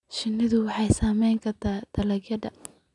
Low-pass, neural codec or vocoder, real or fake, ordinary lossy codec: 10.8 kHz; none; real; none